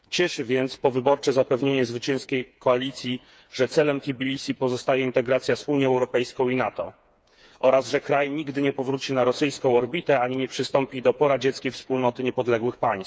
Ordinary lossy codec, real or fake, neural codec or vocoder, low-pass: none; fake; codec, 16 kHz, 4 kbps, FreqCodec, smaller model; none